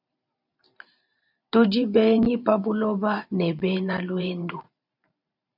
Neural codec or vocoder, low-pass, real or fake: vocoder, 44.1 kHz, 128 mel bands every 512 samples, BigVGAN v2; 5.4 kHz; fake